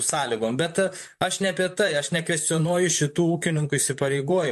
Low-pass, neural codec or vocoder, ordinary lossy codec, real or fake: 14.4 kHz; vocoder, 44.1 kHz, 128 mel bands, Pupu-Vocoder; MP3, 64 kbps; fake